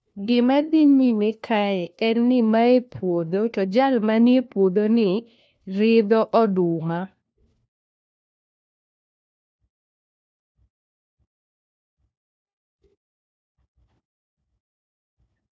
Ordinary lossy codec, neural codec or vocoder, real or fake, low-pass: none; codec, 16 kHz, 1 kbps, FunCodec, trained on LibriTTS, 50 frames a second; fake; none